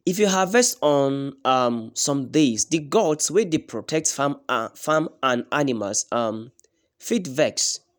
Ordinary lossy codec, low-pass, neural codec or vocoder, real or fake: none; none; none; real